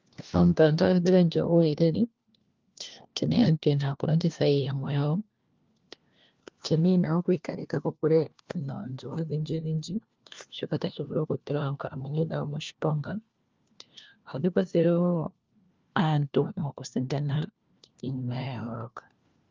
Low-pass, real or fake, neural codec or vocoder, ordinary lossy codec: 7.2 kHz; fake; codec, 16 kHz, 1 kbps, FunCodec, trained on LibriTTS, 50 frames a second; Opus, 32 kbps